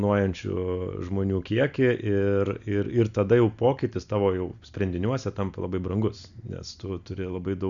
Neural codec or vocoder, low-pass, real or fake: none; 7.2 kHz; real